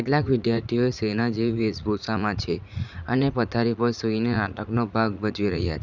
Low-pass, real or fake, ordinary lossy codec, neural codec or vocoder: 7.2 kHz; fake; none; vocoder, 44.1 kHz, 80 mel bands, Vocos